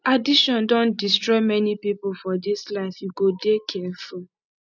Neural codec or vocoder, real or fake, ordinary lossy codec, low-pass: none; real; none; 7.2 kHz